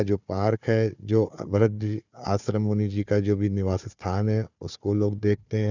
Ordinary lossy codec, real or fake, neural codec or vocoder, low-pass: none; fake; codec, 16 kHz, 4 kbps, FunCodec, trained on Chinese and English, 50 frames a second; 7.2 kHz